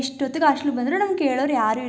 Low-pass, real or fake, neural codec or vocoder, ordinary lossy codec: none; real; none; none